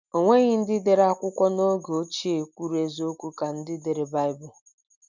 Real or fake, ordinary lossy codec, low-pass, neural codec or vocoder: real; none; 7.2 kHz; none